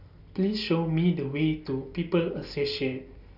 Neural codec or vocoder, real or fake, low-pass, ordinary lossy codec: none; real; 5.4 kHz; none